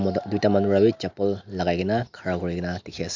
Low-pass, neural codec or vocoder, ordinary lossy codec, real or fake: 7.2 kHz; none; MP3, 64 kbps; real